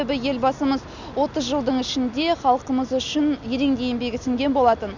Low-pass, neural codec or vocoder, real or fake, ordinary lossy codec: 7.2 kHz; none; real; none